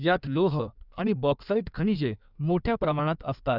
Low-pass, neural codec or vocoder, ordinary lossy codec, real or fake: 5.4 kHz; codec, 16 kHz in and 24 kHz out, 1.1 kbps, FireRedTTS-2 codec; none; fake